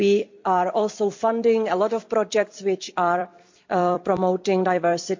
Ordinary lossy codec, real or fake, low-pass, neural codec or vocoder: MP3, 64 kbps; fake; 7.2 kHz; vocoder, 44.1 kHz, 128 mel bands every 256 samples, BigVGAN v2